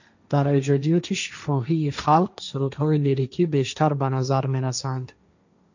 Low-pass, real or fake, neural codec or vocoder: 7.2 kHz; fake; codec, 16 kHz, 1.1 kbps, Voila-Tokenizer